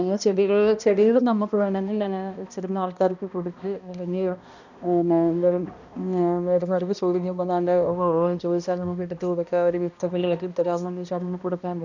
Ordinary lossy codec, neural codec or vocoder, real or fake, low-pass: none; codec, 16 kHz, 1 kbps, X-Codec, HuBERT features, trained on balanced general audio; fake; 7.2 kHz